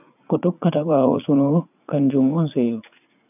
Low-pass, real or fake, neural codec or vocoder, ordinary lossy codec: 3.6 kHz; fake; vocoder, 44.1 kHz, 80 mel bands, Vocos; none